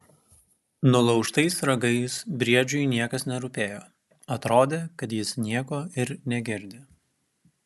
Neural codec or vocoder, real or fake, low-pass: none; real; 14.4 kHz